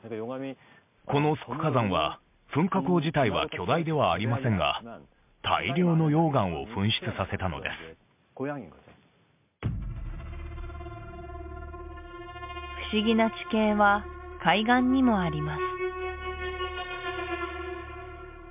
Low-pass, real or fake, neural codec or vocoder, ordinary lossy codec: 3.6 kHz; real; none; MP3, 32 kbps